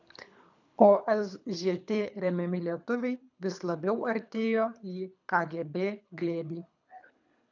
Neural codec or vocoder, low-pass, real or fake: codec, 24 kHz, 3 kbps, HILCodec; 7.2 kHz; fake